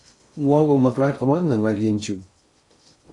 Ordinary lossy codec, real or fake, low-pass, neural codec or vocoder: AAC, 48 kbps; fake; 10.8 kHz; codec, 16 kHz in and 24 kHz out, 0.6 kbps, FocalCodec, streaming, 4096 codes